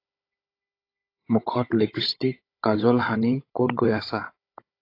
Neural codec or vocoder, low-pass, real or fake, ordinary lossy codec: codec, 16 kHz, 16 kbps, FunCodec, trained on Chinese and English, 50 frames a second; 5.4 kHz; fake; AAC, 32 kbps